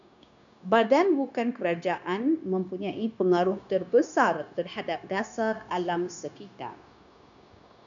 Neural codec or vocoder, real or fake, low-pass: codec, 16 kHz, 0.9 kbps, LongCat-Audio-Codec; fake; 7.2 kHz